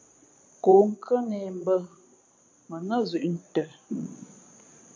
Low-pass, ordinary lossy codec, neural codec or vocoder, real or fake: 7.2 kHz; MP3, 48 kbps; vocoder, 22.05 kHz, 80 mel bands, Vocos; fake